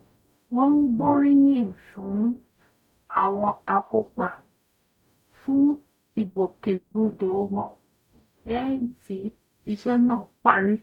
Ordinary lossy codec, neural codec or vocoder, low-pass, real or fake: none; codec, 44.1 kHz, 0.9 kbps, DAC; 19.8 kHz; fake